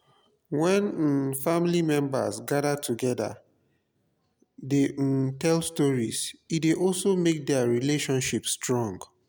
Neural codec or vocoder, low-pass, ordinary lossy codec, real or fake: none; none; none; real